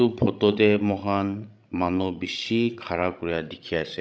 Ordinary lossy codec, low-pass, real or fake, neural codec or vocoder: none; none; fake; codec, 16 kHz, 16 kbps, FreqCodec, larger model